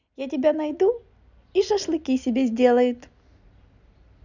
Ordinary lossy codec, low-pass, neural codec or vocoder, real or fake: none; 7.2 kHz; none; real